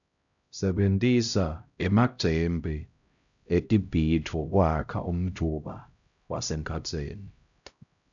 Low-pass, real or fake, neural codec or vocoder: 7.2 kHz; fake; codec, 16 kHz, 0.5 kbps, X-Codec, HuBERT features, trained on LibriSpeech